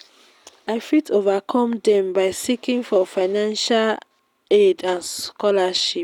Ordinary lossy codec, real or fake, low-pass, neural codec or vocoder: none; real; 19.8 kHz; none